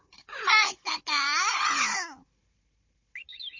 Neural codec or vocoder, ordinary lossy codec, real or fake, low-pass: codec, 16 kHz, 16 kbps, FunCodec, trained on Chinese and English, 50 frames a second; MP3, 32 kbps; fake; 7.2 kHz